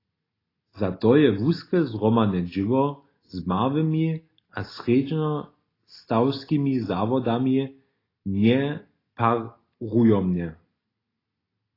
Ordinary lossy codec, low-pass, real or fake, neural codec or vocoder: AAC, 24 kbps; 5.4 kHz; real; none